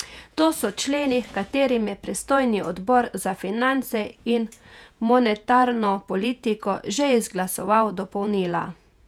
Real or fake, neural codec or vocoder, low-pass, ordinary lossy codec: fake; vocoder, 48 kHz, 128 mel bands, Vocos; 19.8 kHz; none